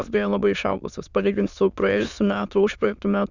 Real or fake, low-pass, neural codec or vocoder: fake; 7.2 kHz; autoencoder, 22.05 kHz, a latent of 192 numbers a frame, VITS, trained on many speakers